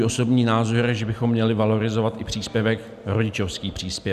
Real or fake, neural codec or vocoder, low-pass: real; none; 14.4 kHz